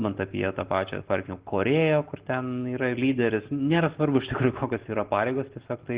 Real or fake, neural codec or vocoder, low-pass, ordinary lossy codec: real; none; 3.6 kHz; Opus, 16 kbps